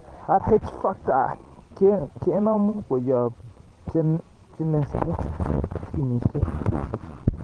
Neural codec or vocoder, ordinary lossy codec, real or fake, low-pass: vocoder, 22.05 kHz, 80 mel bands, Vocos; Opus, 16 kbps; fake; 9.9 kHz